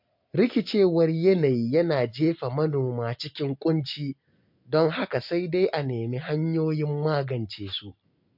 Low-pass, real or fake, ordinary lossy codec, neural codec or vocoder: 5.4 kHz; real; MP3, 48 kbps; none